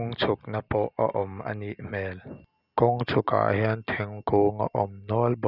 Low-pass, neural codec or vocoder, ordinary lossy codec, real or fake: 5.4 kHz; none; none; real